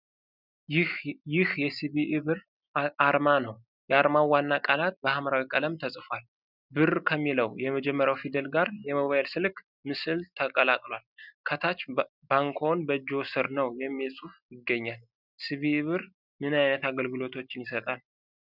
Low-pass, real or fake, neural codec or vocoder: 5.4 kHz; real; none